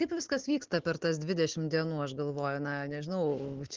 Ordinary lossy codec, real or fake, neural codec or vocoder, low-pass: Opus, 16 kbps; real; none; 7.2 kHz